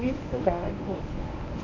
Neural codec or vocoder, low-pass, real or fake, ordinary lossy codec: codec, 24 kHz, 0.9 kbps, WavTokenizer, medium speech release version 1; 7.2 kHz; fake; none